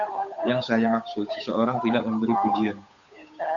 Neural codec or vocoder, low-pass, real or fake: codec, 16 kHz, 8 kbps, FunCodec, trained on Chinese and English, 25 frames a second; 7.2 kHz; fake